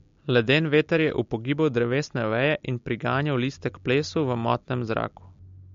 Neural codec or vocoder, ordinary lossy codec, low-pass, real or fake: none; MP3, 48 kbps; 7.2 kHz; real